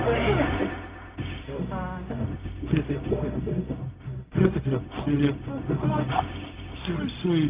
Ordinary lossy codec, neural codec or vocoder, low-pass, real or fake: Opus, 32 kbps; codec, 16 kHz, 0.4 kbps, LongCat-Audio-Codec; 3.6 kHz; fake